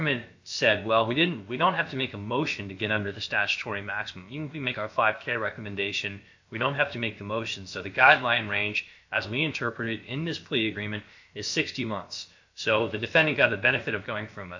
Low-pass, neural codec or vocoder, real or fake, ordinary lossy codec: 7.2 kHz; codec, 16 kHz, about 1 kbps, DyCAST, with the encoder's durations; fake; MP3, 48 kbps